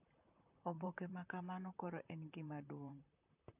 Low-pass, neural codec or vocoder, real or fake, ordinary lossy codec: 3.6 kHz; vocoder, 44.1 kHz, 128 mel bands, Pupu-Vocoder; fake; AAC, 32 kbps